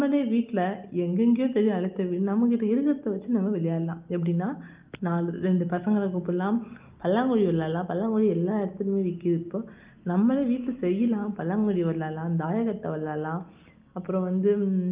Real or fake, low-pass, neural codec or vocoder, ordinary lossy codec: real; 3.6 kHz; none; Opus, 24 kbps